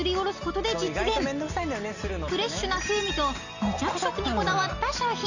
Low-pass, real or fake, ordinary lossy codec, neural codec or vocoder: 7.2 kHz; real; none; none